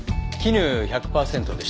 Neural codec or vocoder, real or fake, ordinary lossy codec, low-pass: none; real; none; none